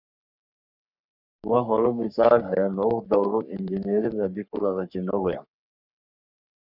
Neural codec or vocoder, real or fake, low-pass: codec, 44.1 kHz, 3.4 kbps, Pupu-Codec; fake; 5.4 kHz